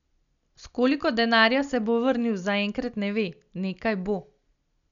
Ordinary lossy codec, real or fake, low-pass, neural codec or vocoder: none; real; 7.2 kHz; none